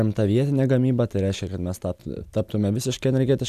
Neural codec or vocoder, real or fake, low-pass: none; real; 14.4 kHz